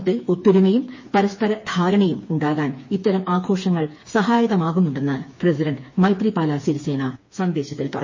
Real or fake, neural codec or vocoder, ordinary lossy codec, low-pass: fake; codec, 16 kHz, 4 kbps, FreqCodec, smaller model; MP3, 32 kbps; 7.2 kHz